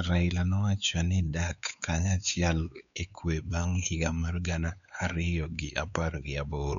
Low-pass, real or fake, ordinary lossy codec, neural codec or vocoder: 7.2 kHz; fake; none; codec, 16 kHz, 4 kbps, X-Codec, WavLM features, trained on Multilingual LibriSpeech